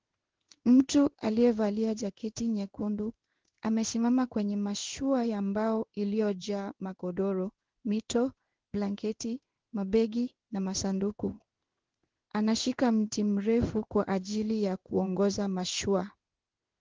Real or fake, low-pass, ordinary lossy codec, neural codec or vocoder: fake; 7.2 kHz; Opus, 16 kbps; codec, 16 kHz in and 24 kHz out, 1 kbps, XY-Tokenizer